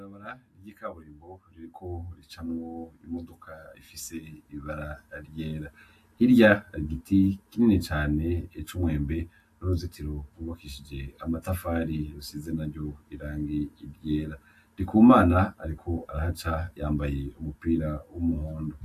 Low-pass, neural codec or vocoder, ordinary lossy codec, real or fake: 14.4 kHz; none; AAC, 64 kbps; real